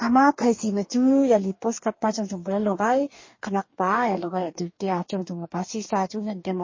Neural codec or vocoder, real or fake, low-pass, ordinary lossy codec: codec, 44.1 kHz, 2.6 kbps, DAC; fake; 7.2 kHz; MP3, 32 kbps